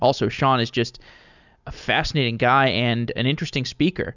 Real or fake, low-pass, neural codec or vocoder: real; 7.2 kHz; none